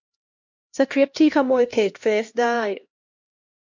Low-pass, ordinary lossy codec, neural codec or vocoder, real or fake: 7.2 kHz; MP3, 48 kbps; codec, 16 kHz, 1 kbps, X-Codec, HuBERT features, trained on LibriSpeech; fake